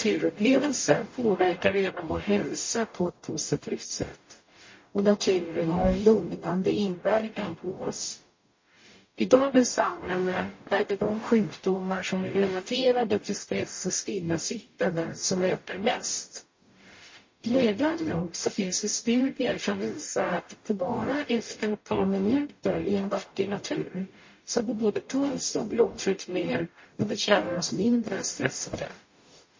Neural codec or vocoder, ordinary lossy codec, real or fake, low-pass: codec, 44.1 kHz, 0.9 kbps, DAC; MP3, 32 kbps; fake; 7.2 kHz